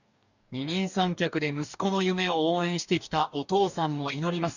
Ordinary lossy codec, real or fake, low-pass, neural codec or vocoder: none; fake; 7.2 kHz; codec, 44.1 kHz, 2.6 kbps, DAC